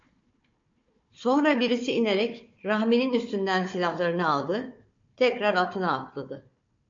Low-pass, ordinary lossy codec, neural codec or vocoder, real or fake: 7.2 kHz; MP3, 48 kbps; codec, 16 kHz, 4 kbps, FunCodec, trained on Chinese and English, 50 frames a second; fake